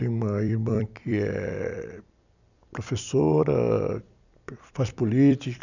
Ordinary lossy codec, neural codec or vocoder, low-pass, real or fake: none; vocoder, 44.1 kHz, 128 mel bands every 256 samples, BigVGAN v2; 7.2 kHz; fake